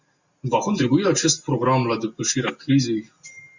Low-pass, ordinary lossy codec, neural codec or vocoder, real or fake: 7.2 kHz; Opus, 64 kbps; none; real